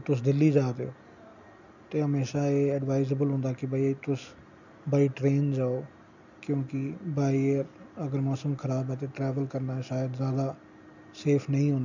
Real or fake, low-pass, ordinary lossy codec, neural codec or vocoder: real; 7.2 kHz; none; none